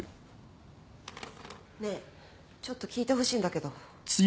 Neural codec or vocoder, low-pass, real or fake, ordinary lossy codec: none; none; real; none